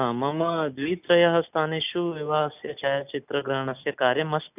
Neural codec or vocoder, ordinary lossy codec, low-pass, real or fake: none; none; 3.6 kHz; real